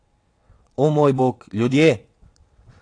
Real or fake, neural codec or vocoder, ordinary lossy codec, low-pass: fake; vocoder, 22.05 kHz, 80 mel bands, WaveNeXt; AAC, 48 kbps; 9.9 kHz